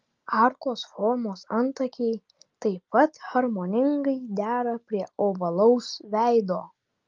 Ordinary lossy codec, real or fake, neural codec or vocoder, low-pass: Opus, 24 kbps; real; none; 7.2 kHz